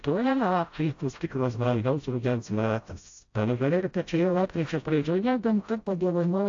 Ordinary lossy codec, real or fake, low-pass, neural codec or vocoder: AAC, 48 kbps; fake; 7.2 kHz; codec, 16 kHz, 0.5 kbps, FreqCodec, smaller model